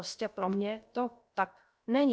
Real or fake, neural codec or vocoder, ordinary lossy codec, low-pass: fake; codec, 16 kHz, about 1 kbps, DyCAST, with the encoder's durations; none; none